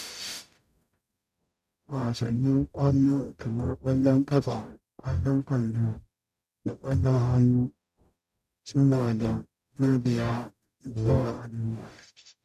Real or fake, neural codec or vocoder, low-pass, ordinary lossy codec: fake; codec, 44.1 kHz, 0.9 kbps, DAC; 14.4 kHz; none